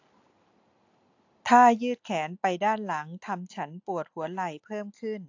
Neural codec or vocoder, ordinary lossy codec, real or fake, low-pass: none; AAC, 48 kbps; real; 7.2 kHz